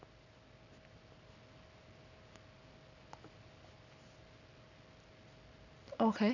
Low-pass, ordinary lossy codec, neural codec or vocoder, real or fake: 7.2 kHz; none; none; real